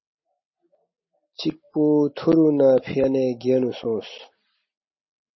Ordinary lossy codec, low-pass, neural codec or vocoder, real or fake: MP3, 24 kbps; 7.2 kHz; none; real